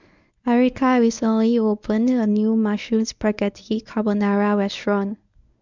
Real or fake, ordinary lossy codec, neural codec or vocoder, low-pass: fake; none; codec, 24 kHz, 0.9 kbps, WavTokenizer, medium speech release version 1; 7.2 kHz